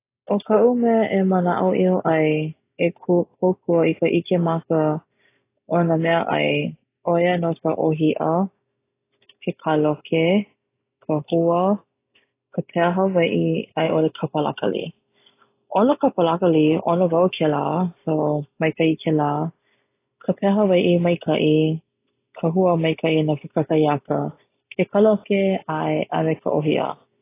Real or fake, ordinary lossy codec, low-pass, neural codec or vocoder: real; AAC, 24 kbps; 3.6 kHz; none